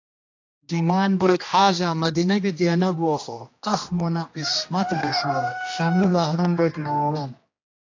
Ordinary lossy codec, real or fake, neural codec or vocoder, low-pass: AAC, 48 kbps; fake; codec, 16 kHz, 1 kbps, X-Codec, HuBERT features, trained on general audio; 7.2 kHz